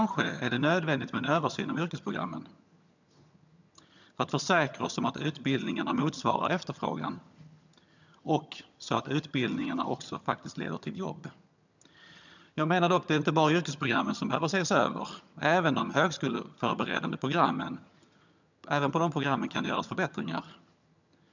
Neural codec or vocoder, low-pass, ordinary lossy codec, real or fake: vocoder, 22.05 kHz, 80 mel bands, HiFi-GAN; 7.2 kHz; none; fake